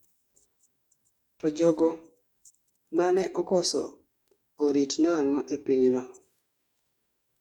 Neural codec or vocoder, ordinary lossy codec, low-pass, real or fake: codec, 44.1 kHz, 2.6 kbps, DAC; none; 19.8 kHz; fake